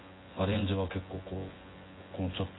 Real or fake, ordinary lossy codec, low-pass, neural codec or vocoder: fake; AAC, 16 kbps; 7.2 kHz; vocoder, 24 kHz, 100 mel bands, Vocos